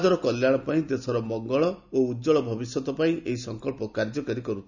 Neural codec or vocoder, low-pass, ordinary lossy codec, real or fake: none; 7.2 kHz; none; real